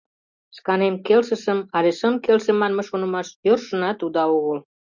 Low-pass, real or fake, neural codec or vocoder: 7.2 kHz; real; none